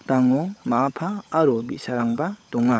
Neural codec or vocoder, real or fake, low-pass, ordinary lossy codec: codec, 16 kHz, 16 kbps, FunCodec, trained on LibriTTS, 50 frames a second; fake; none; none